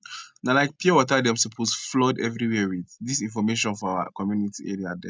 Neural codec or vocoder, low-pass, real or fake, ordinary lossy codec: none; none; real; none